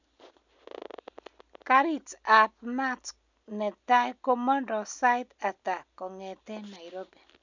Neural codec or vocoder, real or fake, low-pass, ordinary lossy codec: none; real; 7.2 kHz; none